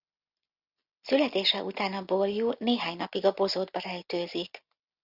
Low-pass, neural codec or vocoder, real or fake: 5.4 kHz; none; real